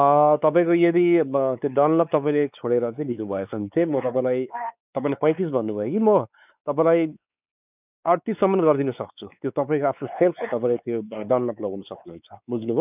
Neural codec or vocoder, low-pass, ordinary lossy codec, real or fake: codec, 16 kHz, 4 kbps, X-Codec, WavLM features, trained on Multilingual LibriSpeech; 3.6 kHz; none; fake